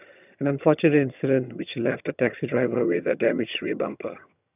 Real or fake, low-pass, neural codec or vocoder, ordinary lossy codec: fake; 3.6 kHz; vocoder, 22.05 kHz, 80 mel bands, HiFi-GAN; none